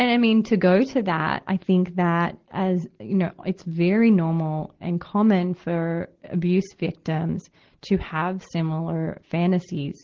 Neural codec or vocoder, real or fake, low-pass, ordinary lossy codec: none; real; 7.2 kHz; Opus, 16 kbps